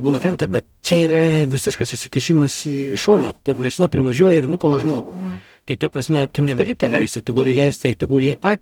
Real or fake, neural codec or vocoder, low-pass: fake; codec, 44.1 kHz, 0.9 kbps, DAC; 19.8 kHz